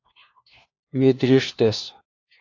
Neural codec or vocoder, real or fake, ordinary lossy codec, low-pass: codec, 16 kHz, 1 kbps, FunCodec, trained on LibriTTS, 50 frames a second; fake; AAC, 48 kbps; 7.2 kHz